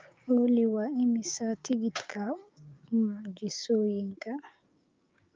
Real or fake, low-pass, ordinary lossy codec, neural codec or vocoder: fake; 7.2 kHz; Opus, 24 kbps; codec, 16 kHz, 6 kbps, DAC